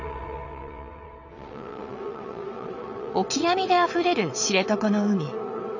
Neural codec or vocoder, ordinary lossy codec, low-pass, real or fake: vocoder, 22.05 kHz, 80 mel bands, WaveNeXt; none; 7.2 kHz; fake